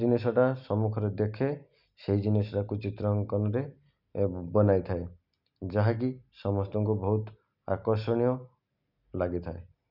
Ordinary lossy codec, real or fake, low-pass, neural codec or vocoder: none; real; 5.4 kHz; none